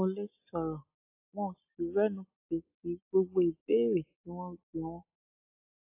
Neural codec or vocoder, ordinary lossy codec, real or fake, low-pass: none; AAC, 32 kbps; real; 3.6 kHz